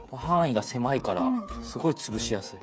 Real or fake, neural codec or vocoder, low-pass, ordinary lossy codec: fake; codec, 16 kHz, 8 kbps, FreqCodec, smaller model; none; none